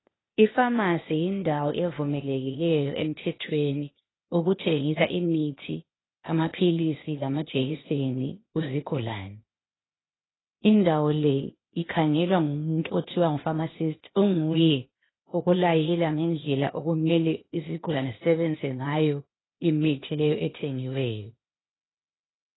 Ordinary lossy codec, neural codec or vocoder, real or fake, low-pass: AAC, 16 kbps; codec, 16 kHz, 0.8 kbps, ZipCodec; fake; 7.2 kHz